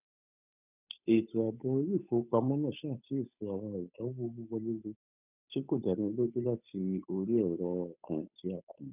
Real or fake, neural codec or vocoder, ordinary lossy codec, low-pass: fake; codec, 16 kHz, 8 kbps, FunCodec, trained on Chinese and English, 25 frames a second; none; 3.6 kHz